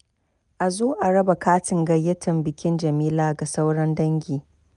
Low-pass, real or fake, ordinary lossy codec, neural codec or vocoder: 10.8 kHz; real; none; none